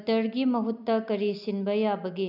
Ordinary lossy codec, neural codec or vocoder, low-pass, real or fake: none; none; 5.4 kHz; real